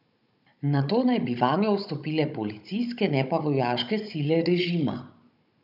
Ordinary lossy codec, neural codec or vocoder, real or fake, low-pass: none; codec, 16 kHz, 16 kbps, FunCodec, trained on Chinese and English, 50 frames a second; fake; 5.4 kHz